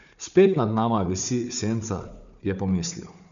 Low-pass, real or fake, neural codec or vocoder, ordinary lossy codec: 7.2 kHz; fake; codec, 16 kHz, 4 kbps, FunCodec, trained on Chinese and English, 50 frames a second; none